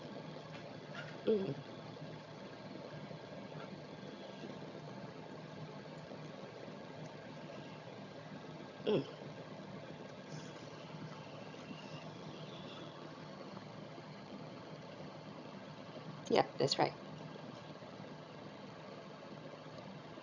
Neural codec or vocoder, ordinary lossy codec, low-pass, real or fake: vocoder, 22.05 kHz, 80 mel bands, HiFi-GAN; none; 7.2 kHz; fake